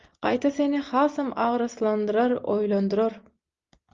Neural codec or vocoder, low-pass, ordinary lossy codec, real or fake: none; 7.2 kHz; Opus, 32 kbps; real